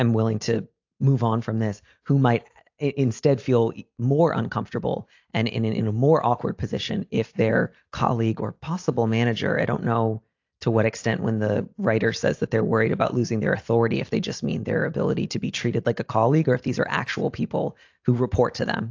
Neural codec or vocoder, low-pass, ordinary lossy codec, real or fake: none; 7.2 kHz; AAC, 48 kbps; real